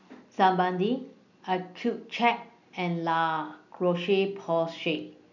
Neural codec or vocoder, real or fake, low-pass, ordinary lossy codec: none; real; 7.2 kHz; none